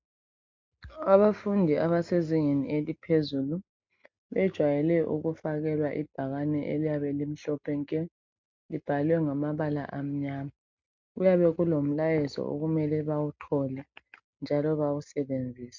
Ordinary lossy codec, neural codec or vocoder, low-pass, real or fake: MP3, 64 kbps; none; 7.2 kHz; real